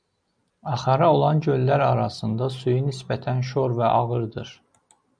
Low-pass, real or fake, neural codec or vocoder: 9.9 kHz; real; none